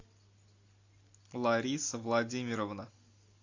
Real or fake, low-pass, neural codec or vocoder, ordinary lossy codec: real; 7.2 kHz; none; AAC, 48 kbps